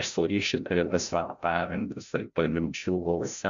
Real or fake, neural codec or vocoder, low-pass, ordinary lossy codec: fake; codec, 16 kHz, 0.5 kbps, FreqCodec, larger model; 7.2 kHz; MP3, 64 kbps